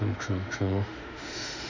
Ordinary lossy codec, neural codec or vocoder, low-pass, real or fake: none; autoencoder, 48 kHz, 32 numbers a frame, DAC-VAE, trained on Japanese speech; 7.2 kHz; fake